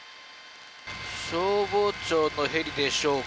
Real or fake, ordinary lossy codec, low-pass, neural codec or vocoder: real; none; none; none